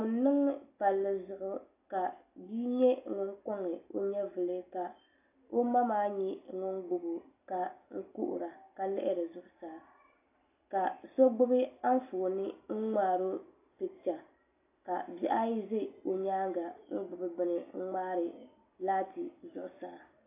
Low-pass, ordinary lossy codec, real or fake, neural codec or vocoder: 3.6 kHz; AAC, 24 kbps; real; none